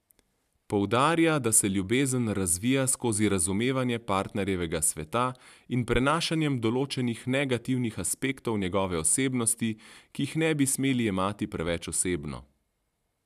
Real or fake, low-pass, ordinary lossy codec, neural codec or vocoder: real; 14.4 kHz; none; none